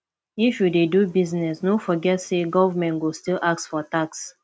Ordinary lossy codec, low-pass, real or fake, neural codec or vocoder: none; none; real; none